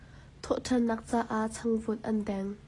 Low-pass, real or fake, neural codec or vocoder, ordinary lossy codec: 10.8 kHz; real; none; AAC, 32 kbps